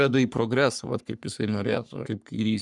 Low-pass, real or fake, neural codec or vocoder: 10.8 kHz; fake; codec, 44.1 kHz, 3.4 kbps, Pupu-Codec